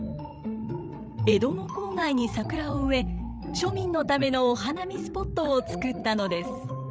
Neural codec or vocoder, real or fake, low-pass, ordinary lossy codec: codec, 16 kHz, 8 kbps, FreqCodec, larger model; fake; none; none